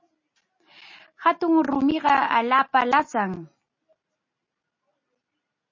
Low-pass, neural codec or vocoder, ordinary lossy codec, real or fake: 7.2 kHz; none; MP3, 32 kbps; real